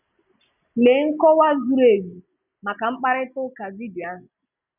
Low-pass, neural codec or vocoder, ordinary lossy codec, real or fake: 3.6 kHz; none; none; real